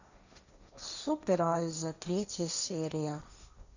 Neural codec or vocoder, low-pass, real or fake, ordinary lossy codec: codec, 16 kHz, 1.1 kbps, Voila-Tokenizer; 7.2 kHz; fake; none